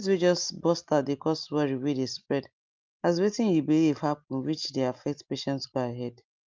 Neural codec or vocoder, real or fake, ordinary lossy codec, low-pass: none; real; Opus, 24 kbps; 7.2 kHz